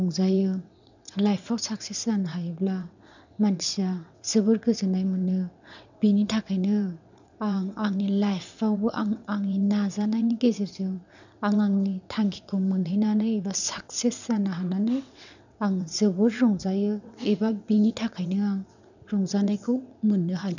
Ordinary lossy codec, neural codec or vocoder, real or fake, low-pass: none; vocoder, 22.05 kHz, 80 mel bands, WaveNeXt; fake; 7.2 kHz